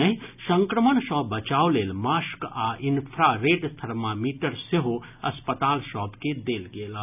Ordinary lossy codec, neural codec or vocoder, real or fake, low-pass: none; none; real; 3.6 kHz